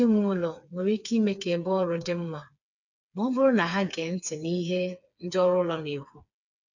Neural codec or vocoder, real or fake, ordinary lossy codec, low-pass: codec, 16 kHz, 4 kbps, FreqCodec, smaller model; fake; none; 7.2 kHz